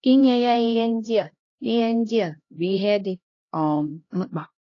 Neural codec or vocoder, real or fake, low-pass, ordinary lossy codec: codec, 16 kHz, 1 kbps, X-Codec, HuBERT features, trained on LibriSpeech; fake; 7.2 kHz; AAC, 32 kbps